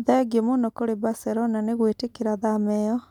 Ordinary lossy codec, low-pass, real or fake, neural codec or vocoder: MP3, 96 kbps; 19.8 kHz; real; none